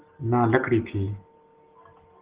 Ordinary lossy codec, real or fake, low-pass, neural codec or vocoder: Opus, 16 kbps; real; 3.6 kHz; none